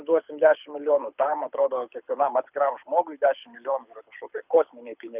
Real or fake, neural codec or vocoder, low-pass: fake; codec, 24 kHz, 6 kbps, HILCodec; 3.6 kHz